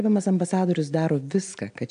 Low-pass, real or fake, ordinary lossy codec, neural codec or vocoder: 9.9 kHz; real; AAC, 64 kbps; none